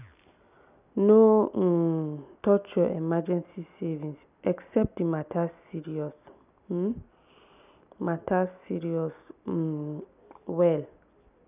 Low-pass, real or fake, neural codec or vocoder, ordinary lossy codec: 3.6 kHz; real; none; none